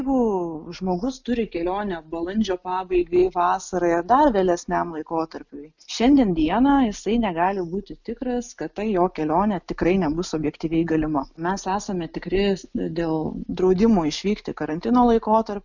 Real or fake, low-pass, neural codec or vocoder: real; 7.2 kHz; none